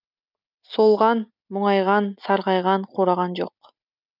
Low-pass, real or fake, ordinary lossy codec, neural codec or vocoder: 5.4 kHz; real; none; none